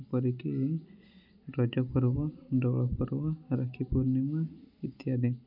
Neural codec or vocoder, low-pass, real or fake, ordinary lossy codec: none; 5.4 kHz; real; none